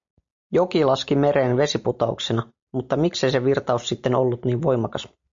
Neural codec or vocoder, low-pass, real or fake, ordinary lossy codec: none; 7.2 kHz; real; MP3, 64 kbps